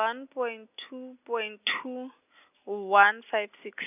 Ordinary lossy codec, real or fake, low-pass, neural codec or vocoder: none; real; 3.6 kHz; none